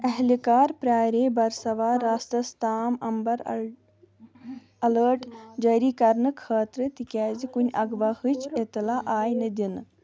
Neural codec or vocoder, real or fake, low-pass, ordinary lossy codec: none; real; none; none